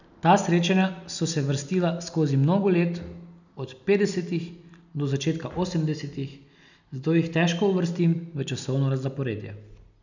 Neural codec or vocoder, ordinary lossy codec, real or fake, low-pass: none; none; real; 7.2 kHz